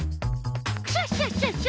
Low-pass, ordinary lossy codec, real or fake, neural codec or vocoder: none; none; real; none